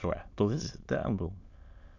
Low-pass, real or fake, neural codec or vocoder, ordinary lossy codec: 7.2 kHz; fake; autoencoder, 22.05 kHz, a latent of 192 numbers a frame, VITS, trained on many speakers; none